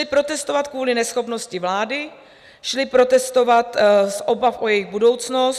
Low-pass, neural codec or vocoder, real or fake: 14.4 kHz; none; real